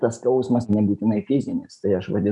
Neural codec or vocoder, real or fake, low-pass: none; real; 10.8 kHz